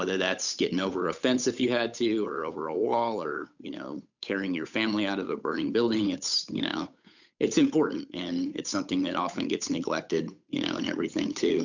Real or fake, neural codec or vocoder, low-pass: fake; codec, 16 kHz, 8 kbps, FunCodec, trained on Chinese and English, 25 frames a second; 7.2 kHz